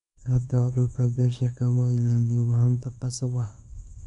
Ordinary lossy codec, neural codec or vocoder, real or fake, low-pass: none; codec, 24 kHz, 0.9 kbps, WavTokenizer, small release; fake; 10.8 kHz